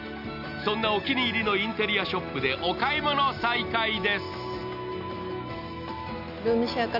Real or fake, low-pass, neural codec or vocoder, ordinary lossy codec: real; 5.4 kHz; none; none